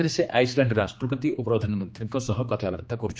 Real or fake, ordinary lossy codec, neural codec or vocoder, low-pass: fake; none; codec, 16 kHz, 2 kbps, X-Codec, HuBERT features, trained on general audio; none